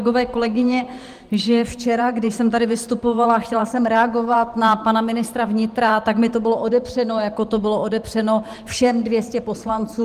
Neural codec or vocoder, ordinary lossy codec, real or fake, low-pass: vocoder, 44.1 kHz, 128 mel bands every 512 samples, BigVGAN v2; Opus, 24 kbps; fake; 14.4 kHz